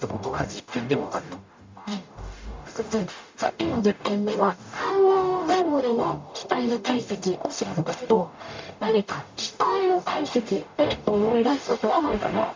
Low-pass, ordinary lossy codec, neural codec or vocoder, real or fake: 7.2 kHz; MP3, 64 kbps; codec, 44.1 kHz, 0.9 kbps, DAC; fake